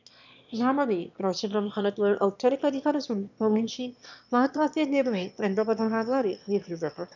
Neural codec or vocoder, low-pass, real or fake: autoencoder, 22.05 kHz, a latent of 192 numbers a frame, VITS, trained on one speaker; 7.2 kHz; fake